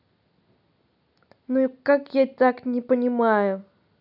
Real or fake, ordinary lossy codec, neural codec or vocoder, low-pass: real; none; none; 5.4 kHz